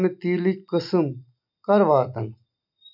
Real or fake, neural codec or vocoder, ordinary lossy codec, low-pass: real; none; none; 5.4 kHz